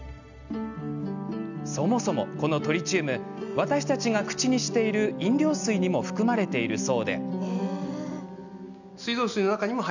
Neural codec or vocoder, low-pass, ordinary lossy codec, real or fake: none; 7.2 kHz; none; real